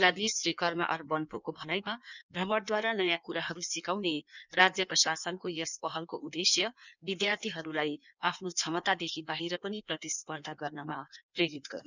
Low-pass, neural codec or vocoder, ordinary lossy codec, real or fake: 7.2 kHz; codec, 16 kHz in and 24 kHz out, 1.1 kbps, FireRedTTS-2 codec; none; fake